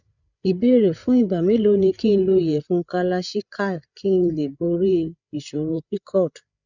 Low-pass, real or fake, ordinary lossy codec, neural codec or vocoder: 7.2 kHz; fake; none; codec, 16 kHz, 8 kbps, FreqCodec, larger model